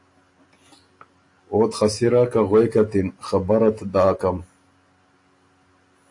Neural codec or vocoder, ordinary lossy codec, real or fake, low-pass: none; AAC, 48 kbps; real; 10.8 kHz